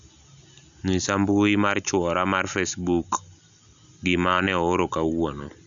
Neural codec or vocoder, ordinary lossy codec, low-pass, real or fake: none; none; 7.2 kHz; real